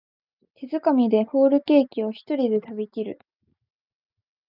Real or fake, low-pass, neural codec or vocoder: real; 5.4 kHz; none